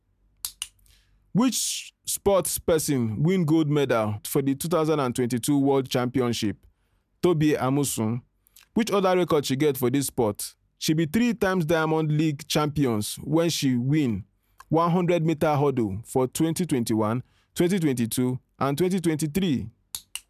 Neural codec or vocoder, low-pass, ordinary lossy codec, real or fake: none; 14.4 kHz; none; real